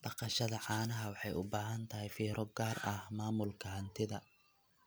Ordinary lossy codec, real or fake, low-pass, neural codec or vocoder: none; real; none; none